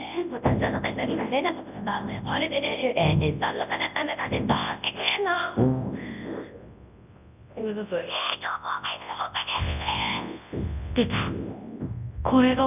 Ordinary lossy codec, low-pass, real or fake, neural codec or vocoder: none; 3.6 kHz; fake; codec, 24 kHz, 0.9 kbps, WavTokenizer, large speech release